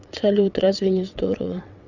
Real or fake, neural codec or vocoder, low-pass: fake; vocoder, 22.05 kHz, 80 mel bands, WaveNeXt; 7.2 kHz